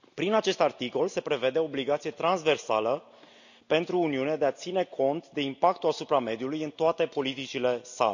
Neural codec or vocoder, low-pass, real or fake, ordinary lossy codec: none; 7.2 kHz; real; none